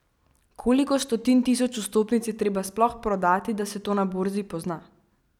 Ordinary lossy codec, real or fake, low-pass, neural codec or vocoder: none; real; 19.8 kHz; none